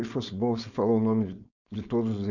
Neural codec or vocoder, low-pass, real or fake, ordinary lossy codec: codec, 16 kHz, 4.8 kbps, FACodec; 7.2 kHz; fake; none